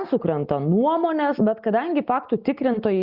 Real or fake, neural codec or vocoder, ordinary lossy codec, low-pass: real; none; Opus, 64 kbps; 5.4 kHz